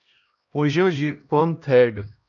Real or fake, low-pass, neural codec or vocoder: fake; 7.2 kHz; codec, 16 kHz, 1 kbps, X-Codec, HuBERT features, trained on LibriSpeech